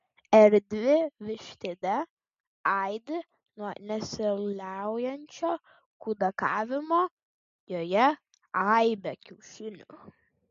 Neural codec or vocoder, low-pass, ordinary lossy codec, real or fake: none; 7.2 kHz; MP3, 48 kbps; real